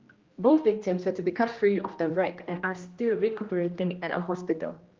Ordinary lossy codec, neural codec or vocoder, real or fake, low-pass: Opus, 32 kbps; codec, 16 kHz, 1 kbps, X-Codec, HuBERT features, trained on general audio; fake; 7.2 kHz